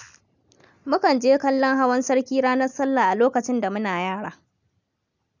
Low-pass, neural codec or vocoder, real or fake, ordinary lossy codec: 7.2 kHz; none; real; none